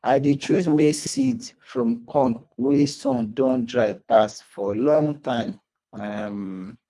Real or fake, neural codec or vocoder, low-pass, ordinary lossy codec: fake; codec, 24 kHz, 1.5 kbps, HILCodec; none; none